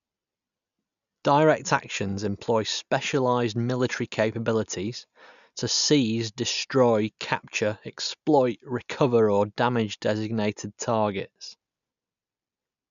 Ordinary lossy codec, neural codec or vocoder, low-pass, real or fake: none; none; 7.2 kHz; real